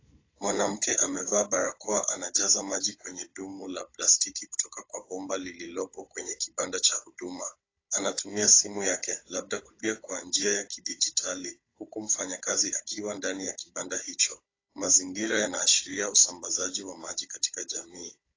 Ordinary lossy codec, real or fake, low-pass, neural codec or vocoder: AAC, 32 kbps; fake; 7.2 kHz; codec, 16 kHz, 16 kbps, FunCodec, trained on Chinese and English, 50 frames a second